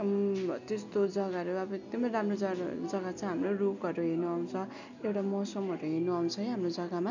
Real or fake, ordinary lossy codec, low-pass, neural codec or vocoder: real; none; 7.2 kHz; none